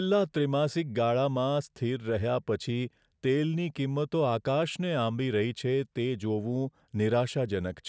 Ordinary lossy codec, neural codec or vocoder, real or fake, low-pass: none; none; real; none